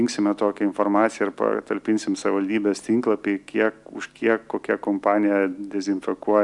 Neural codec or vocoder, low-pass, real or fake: none; 10.8 kHz; real